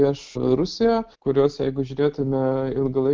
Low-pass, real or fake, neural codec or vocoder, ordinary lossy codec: 7.2 kHz; real; none; Opus, 24 kbps